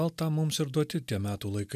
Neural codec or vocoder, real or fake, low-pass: none; real; 14.4 kHz